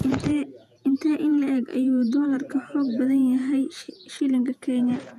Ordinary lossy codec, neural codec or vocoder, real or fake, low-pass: none; vocoder, 48 kHz, 128 mel bands, Vocos; fake; 14.4 kHz